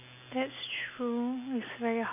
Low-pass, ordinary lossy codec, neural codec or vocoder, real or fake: 3.6 kHz; none; none; real